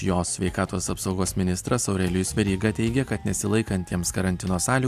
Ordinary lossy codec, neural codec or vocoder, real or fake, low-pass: AAC, 96 kbps; none; real; 14.4 kHz